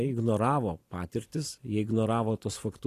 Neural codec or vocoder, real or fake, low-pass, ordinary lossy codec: none; real; 14.4 kHz; AAC, 48 kbps